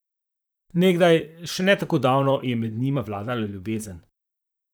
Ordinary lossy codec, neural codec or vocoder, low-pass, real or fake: none; vocoder, 44.1 kHz, 128 mel bands, Pupu-Vocoder; none; fake